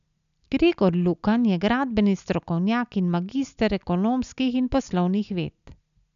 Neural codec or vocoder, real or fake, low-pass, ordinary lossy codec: none; real; 7.2 kHz; none